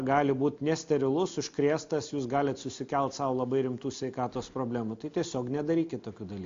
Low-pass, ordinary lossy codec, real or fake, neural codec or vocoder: 7.2 kHz; MP3, 48 kbps; real; none